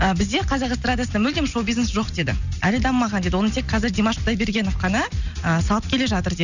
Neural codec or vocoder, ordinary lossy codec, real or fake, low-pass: none; none; real; 7.2 kHz